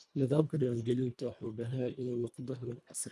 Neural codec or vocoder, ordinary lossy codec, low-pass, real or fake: codec, 24 kHz, 1.5 kbps, HILCodec; none; none; fake